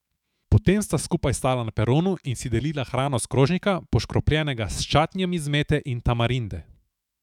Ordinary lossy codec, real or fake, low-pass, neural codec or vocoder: none; fake; 19.8 kHz; autoencoder, 48 kHz, 128 numbers a frame, DAC-VAE, trained on Japanese speech